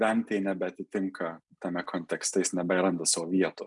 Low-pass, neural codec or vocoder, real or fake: 10.8 kHz; none; real